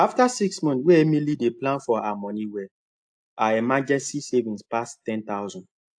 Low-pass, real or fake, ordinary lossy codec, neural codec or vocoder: 9.9 kHz; real; AAC, 64 kbps; none